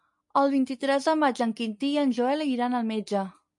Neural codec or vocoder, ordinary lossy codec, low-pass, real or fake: none; MP3, 64 kbps; 10.8 kHz; real